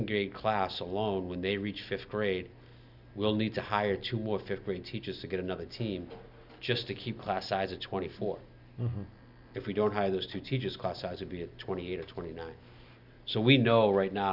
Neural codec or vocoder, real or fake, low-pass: none; real; 5.4 kHz